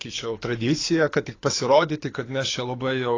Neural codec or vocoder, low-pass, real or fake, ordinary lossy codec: codec, 24 kHz, 6 kbps, HILCodec; 7.2 kHz; fake; AAC, 32 kbps